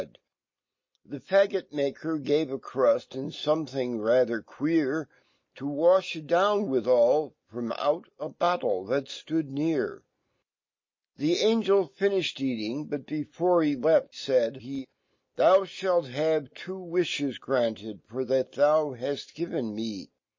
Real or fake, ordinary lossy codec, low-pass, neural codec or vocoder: real; MP3, 32 kbps; 7.2 kHz; none